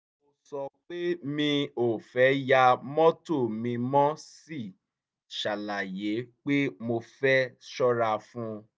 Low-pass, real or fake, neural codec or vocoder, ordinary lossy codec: none; real; none; none